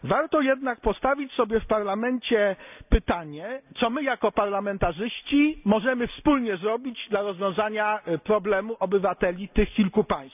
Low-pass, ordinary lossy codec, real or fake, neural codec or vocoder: 3.6 kHz; none; real; none